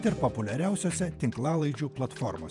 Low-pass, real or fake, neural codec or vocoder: 10.8 kHz; fake; vocoder, 48 kHz, 128 mel bands, Vocos